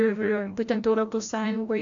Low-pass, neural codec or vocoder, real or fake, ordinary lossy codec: 7.2 kHz; codec, 16 kHz, 0.5 kbps, FreqCodec, larger model; fake; AAC, 64 kbps